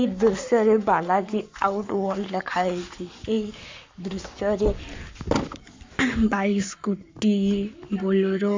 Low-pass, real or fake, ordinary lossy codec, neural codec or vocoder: 7.2 kHz; fake; MP3, 64 kbps; codec, 44.1 kHz, 7.8 kbps, Pupu-Codec